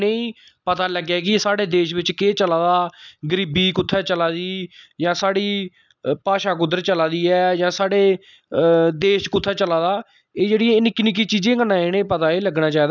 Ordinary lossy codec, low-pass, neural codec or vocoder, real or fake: none; 7.2 kHz; none; real